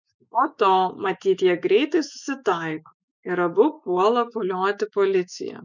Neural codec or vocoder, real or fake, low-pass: autoencoder, 48 kHz, 128 numbers a frame, DAC-VAE, trained on Japanese speech; fake; 7.2 kHz